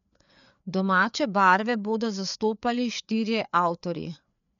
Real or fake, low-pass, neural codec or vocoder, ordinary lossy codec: fake; 7.2 kHz; codec, 16 kHz, 4 kbps, FreqCodec, larger model; none